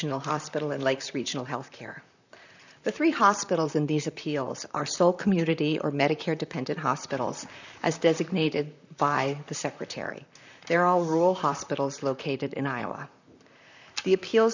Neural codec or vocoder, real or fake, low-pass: vocoder, 44.1 kHz, 128 mel bands, Pupu-Vocoder; fake; 7.2 kHz